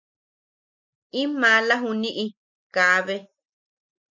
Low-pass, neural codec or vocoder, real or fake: 7.2 kHz; none; real